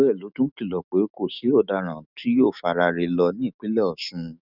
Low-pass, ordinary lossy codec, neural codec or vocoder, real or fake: 5.4 kHz; none; autoencoder, 48 kHz, 128 numbers a frame, DAC-VAE, trained on Japanese speech; fake